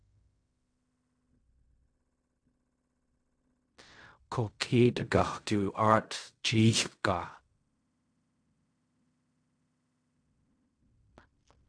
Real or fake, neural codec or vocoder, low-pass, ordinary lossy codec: fake; codec, 16 kHz in and 24 kHz out, 0.4 kbps, LongCat-Audio-Codec, fine tuned four codebook decoder; 9.9 kHz; AAC, 64 kbps